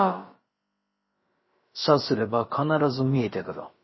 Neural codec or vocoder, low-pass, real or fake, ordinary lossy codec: codec, 16 kHz, about 1 kbps, DyCAST, with the encoder's durations; 7.2 kHz; fake; MP3, 24 kbps